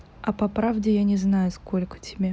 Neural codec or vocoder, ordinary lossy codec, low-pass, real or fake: none; none; none; real